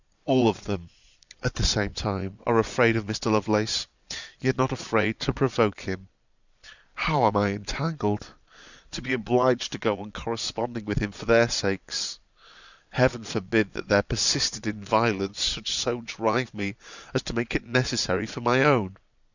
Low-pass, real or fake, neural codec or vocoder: 7.2 kHz; fake; vocoder, 22.05 kHz, 80 mel bands, Vocos